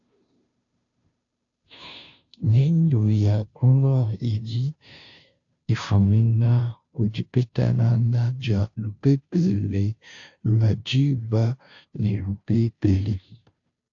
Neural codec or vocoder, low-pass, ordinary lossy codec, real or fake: codec, 16 kHz, 0.5 kbps, FunCodec, trained on Chinese and English, 25 frames a second; 7.2 kHz; AAC, 48 kbps; fake